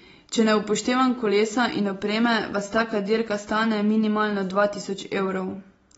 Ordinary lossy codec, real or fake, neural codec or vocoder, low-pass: AAC, 24 kbps; real; none; 9.9 kHz